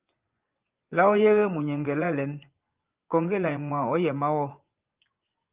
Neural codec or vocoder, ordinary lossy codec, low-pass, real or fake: vocoder, 24 kHz, 100 mel bands, Vocos; Opus, 24 kbps; 3.6 kHz; fake